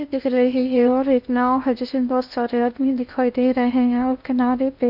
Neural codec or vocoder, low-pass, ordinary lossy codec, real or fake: codec, 16 kHz in and 24 kHz out, 0.8 kbps, FocalCodec, streaming, 65536 codes; 5.4 kHz; none; fake